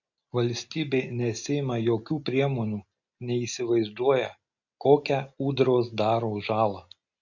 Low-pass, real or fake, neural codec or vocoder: 7.2 kHz; real; none